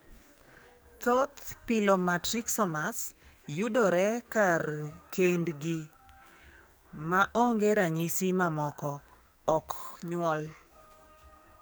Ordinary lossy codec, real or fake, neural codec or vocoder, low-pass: none; fake; codec, 44.1 kHz, 2.6 kbps, SNAC; none